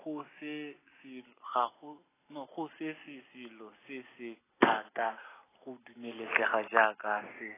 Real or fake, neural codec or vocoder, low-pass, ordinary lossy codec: real; none; 3.6 kHz; AAC, 16 kbps